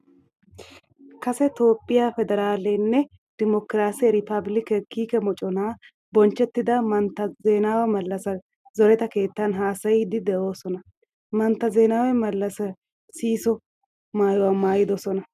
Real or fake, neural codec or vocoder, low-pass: real; none; 14.4 kHz